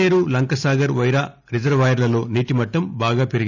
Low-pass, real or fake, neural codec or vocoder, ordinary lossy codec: 7.2 kHz; real; none; none